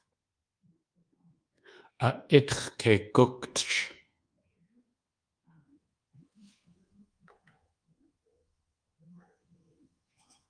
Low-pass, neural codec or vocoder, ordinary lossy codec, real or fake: 9.9 kHz; codec, 24 kHz, 1.2 kbps, DualCodec; Opus, 32 kbps; fake